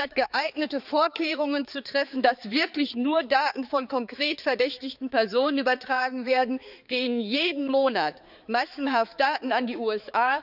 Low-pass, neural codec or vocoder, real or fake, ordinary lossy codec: 5.4 kHz; codec, 16 kHz, 4 kbps, X-Codec, HuBERT features, trained on balanced general audio; fake; none